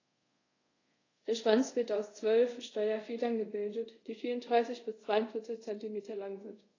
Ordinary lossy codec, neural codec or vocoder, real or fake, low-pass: AAC, 32 kbps; codec, 24 kHz, 0.5 kbps, DualCodec; fake; 7.2 kHz